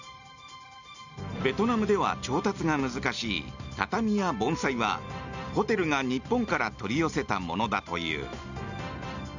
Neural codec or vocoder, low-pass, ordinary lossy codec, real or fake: none; 7.2 kHz; none; real